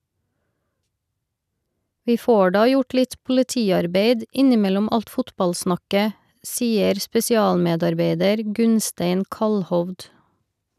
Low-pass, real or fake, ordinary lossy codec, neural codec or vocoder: 14.4 kHz; real; none; none